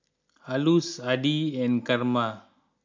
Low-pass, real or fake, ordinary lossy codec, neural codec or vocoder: 7.2 kHz; real; MP3, 64 kbps; none